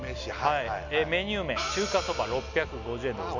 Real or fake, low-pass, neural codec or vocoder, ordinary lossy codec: real; 7.2 kHz; none; none